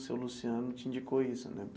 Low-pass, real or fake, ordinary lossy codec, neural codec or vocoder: none; real; none; none